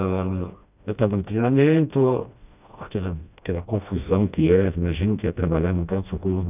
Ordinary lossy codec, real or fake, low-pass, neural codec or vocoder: none; fake; 3.6 kHz; codec, 16 kHz, 1 kbps, FreqCodec, smaller model